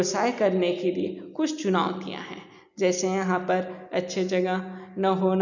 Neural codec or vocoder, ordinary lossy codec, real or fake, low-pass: none; none; real; 7.2 kHz